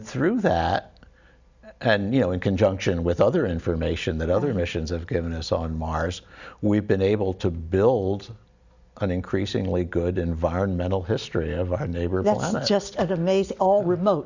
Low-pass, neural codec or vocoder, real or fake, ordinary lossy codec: 7.2 kHz; none; real; Opus, 64 kbps